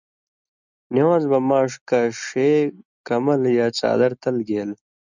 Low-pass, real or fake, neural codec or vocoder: 7.2 kHz; real; none